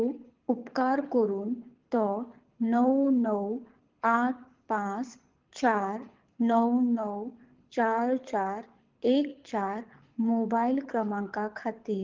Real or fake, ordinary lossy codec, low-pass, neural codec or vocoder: fake; Opus, 16 kbps; 7.2 kHz; codec, 24 kHz, 6 kbps, HILCodec